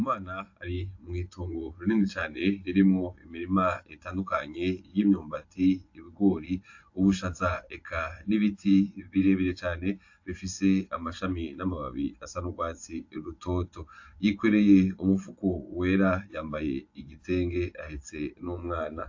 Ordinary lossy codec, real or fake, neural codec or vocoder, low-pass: AAC, 48 kbps; fake; vocoder, 24 kHz, 100 mel bands, Vocos; 7.2 kHz